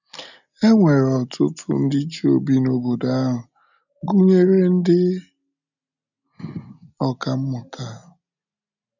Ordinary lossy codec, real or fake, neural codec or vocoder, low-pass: none; real; none; 7.2 kHz